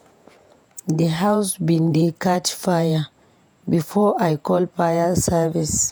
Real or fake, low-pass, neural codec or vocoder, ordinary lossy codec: fake; none; vocoder, 48 kHz, 128 mel bands, Vocos; none